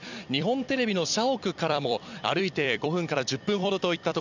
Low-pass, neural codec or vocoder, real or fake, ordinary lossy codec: 7.2 kHz; vocoder, 44.1 kHz, 128 mel bands every 256 samples, BigVGAN v2; fake; none